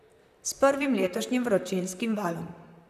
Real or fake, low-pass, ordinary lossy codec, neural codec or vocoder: fake; 14.4 kHz; none; vocoder, 44.1 kHz, 128 mel bands, Pupu-Vocoder